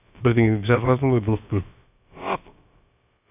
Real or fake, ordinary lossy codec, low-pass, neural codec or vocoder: fake; none; 3.6 kHz; codec, 16 kHz, about 1 kbps, DyCAST, with the encoder's durations